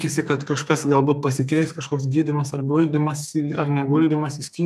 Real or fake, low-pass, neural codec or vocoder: fake; 14.4 kHz; codec, 32 kHz, 1.9 kbps, SNAC